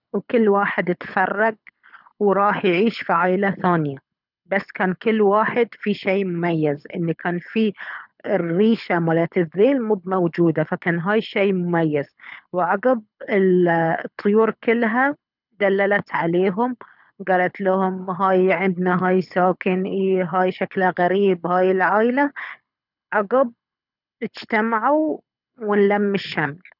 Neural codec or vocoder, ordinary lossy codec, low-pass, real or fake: codec, 24 kHz, 6 kbps, HILCodec; none; 5.4 kHz; fake